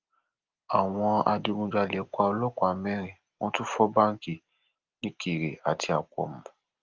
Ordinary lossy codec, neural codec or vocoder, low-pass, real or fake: Opus, 32 kbps; none; 7.2 kHz; real